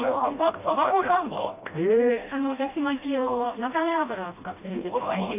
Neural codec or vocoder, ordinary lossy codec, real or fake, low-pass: codec, 16 kHz, 1 kbps, FreqCodec, smaller model; AAC, 32 kbps; fake; 3.6 kHz